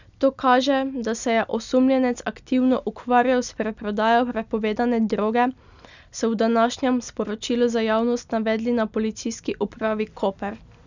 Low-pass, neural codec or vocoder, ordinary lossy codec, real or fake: 7.2 kHz; none; none; real